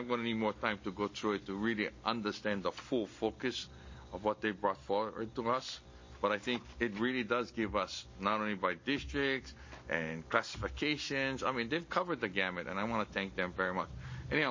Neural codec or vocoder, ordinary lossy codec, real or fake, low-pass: none; MP3, 32 kbps; real; 7.2 kHz